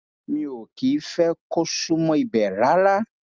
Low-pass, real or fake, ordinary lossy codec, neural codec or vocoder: 7.2 kHz; real; Opus, 24 kbps; none